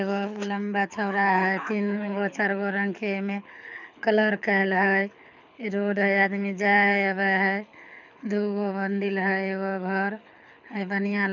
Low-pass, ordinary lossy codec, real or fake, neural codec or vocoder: 7.2 kHz; none; fake; codec, 24 kHz, 6 kbps, HILCodec